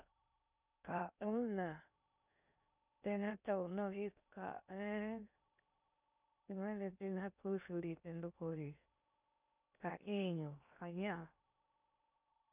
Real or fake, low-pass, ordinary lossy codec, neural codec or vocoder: fake; 3.6 kHz; none; codec, 16 kHz in and 24 kHz out, 0.6 kbps, FocalCodec, streaming, 2048 codes